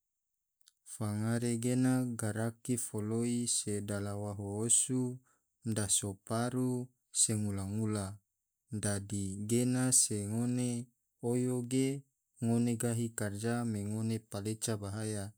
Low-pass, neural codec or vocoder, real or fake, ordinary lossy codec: none; none; real; none